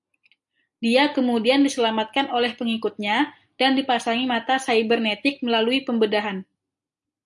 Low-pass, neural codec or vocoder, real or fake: 10.8 kHz; none; real